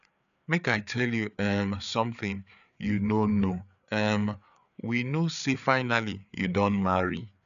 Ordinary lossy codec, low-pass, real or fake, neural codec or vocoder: none; 7.2 kHz; fake; codec, 16 kHz, 4 kbps, FreqCodec, larger model